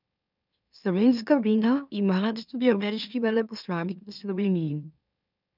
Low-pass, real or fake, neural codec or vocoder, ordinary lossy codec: 5.4 kHz; fake; autoencoder, 44.1 kHz, a latent of 192 numbers a frame, MeloTTS; none